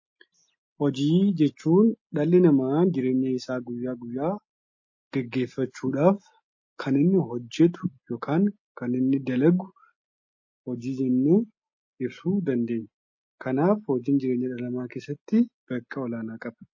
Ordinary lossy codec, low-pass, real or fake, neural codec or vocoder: MP3, 32 kbps; 7.2 kHz; real; none